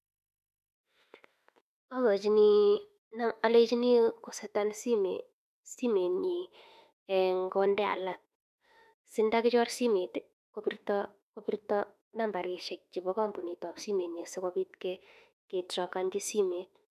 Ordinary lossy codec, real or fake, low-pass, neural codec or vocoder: none; fake; 14.4 kHz; autoencoder, 48 kHz, 32 numbers a frame, DAC-VAE, trained on Japanese speech